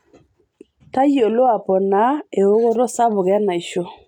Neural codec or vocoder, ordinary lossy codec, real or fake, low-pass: none; none; real; 19.8 kHz